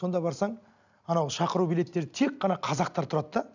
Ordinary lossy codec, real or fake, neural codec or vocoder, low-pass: none; real; none; 7.2 kHz